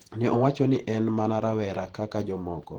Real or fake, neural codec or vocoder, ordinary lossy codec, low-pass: fake; vocoder, 48 kHz, 128 mel bands, Vocos; Opus, 16 kbps; 19.8 kHz